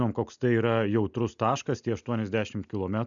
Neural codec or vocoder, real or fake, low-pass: none; real; 7.2 kHz